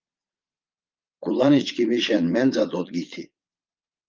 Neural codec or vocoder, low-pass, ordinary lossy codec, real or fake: vocoder, 22.05 kHz, 80 mel bands, Vocos; 7.2 kHz; Opus, 24 kbps; fake